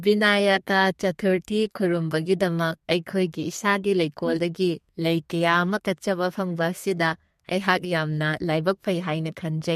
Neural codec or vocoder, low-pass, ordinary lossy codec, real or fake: codec, 32 kHz, 1.9 kbps, SNAC; 14.4 kHz; MP3, 64 kbps; fake